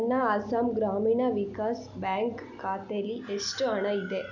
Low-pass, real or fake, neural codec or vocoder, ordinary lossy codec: 7.2 kHz; real; none; none